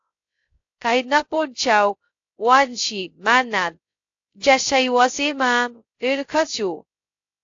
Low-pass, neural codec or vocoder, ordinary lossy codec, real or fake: 7.2 kHz; codec, 16 kHz, 0.2 kbps, FocalCodec; AAC, 48 kbps; fake